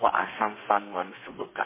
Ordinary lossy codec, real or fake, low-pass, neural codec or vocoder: MP3, 16 kbps; fake; 3.6 kHz; codec, 32 kHz, 1.9 kbps, SNAC